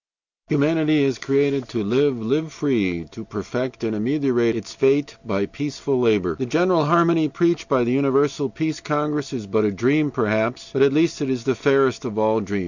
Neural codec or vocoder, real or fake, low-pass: none; real; 7.2 kHz